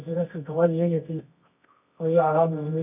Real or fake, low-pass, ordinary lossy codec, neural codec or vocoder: fake; 3.6 kHz; none; codec, 24 kHz, 0.9 kbps, WavTokenizer, medium music audio release